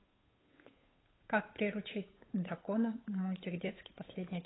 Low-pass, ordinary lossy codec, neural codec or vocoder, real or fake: 7.2 kHz; AAC, 16 kbps; codec, 16 kHz, 8 kbps, FunCodec, trained on Chinese and English, 25 frames a second; fake